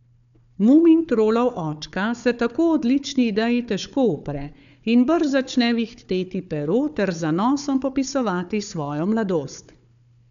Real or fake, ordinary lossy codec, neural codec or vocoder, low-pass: fake; none; codec, 16 kHz, 4 kbps, FunCodec, trained on Chinese and English, 50 frames a second; 7.2 kHz